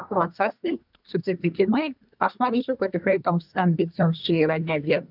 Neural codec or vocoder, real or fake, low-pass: codec, 24 kHz, 1 kbps, SNAC; fake; 5.4 kHz